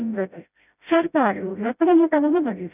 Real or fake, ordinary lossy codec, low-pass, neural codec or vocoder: fake; none; 3.6 kHz; codec, 16 kHz, 0.5 kbps, FreqCodec, smaller model